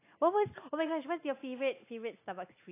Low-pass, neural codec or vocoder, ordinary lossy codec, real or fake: 3.6 kHz; none; AAC, 24 kbps; real